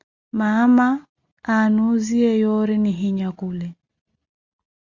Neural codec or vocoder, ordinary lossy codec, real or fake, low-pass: none; Opus, 64 kbps; real; 7.2 kHz